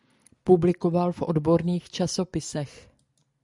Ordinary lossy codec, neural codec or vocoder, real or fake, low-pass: MP3, 96 kbps; none; real; 10.8 kHz